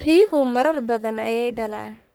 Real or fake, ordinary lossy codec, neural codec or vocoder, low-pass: fake; none; codec, 44.1 kHz, 1.7 kbps, Pupu-Codec; none